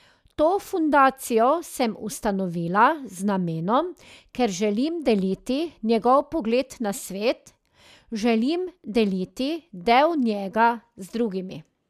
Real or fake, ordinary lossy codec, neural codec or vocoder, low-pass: real; none; none; 14.4 kHz